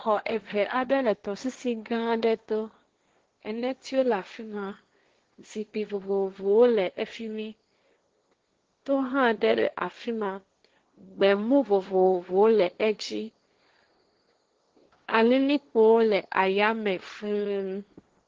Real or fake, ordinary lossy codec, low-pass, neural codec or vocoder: fake; Opus, 16 kbps; 7.2 kHz; codec, 16 kHz, 1.1 kbps, Voila-Tokenizer